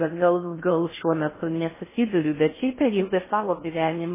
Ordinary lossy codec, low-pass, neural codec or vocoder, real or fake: MP3, 16 kbps; 3.6 kHz; codec, 16 kHz in and 24 kHz out, 0.6 kbps, FocalCodec, streaming, 4096 codes; fake